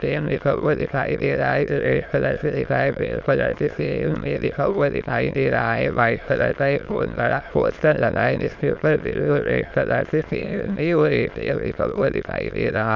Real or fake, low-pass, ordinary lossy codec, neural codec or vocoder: fake; 7.2 kHz; none; autoencoder, 22.05 kHz, a latent of 192 numbers a frame, VITS, trained on many speakers